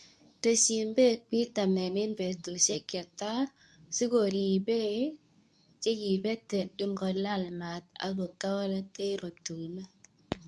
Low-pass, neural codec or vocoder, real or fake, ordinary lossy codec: none; codec, 24 kHz, 0.9 kbps, WavTokenizer, medium speech release version 2; fake; none